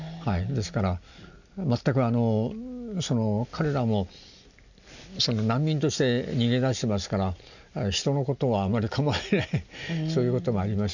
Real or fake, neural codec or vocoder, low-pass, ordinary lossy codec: real; none; 7.2 kHz; none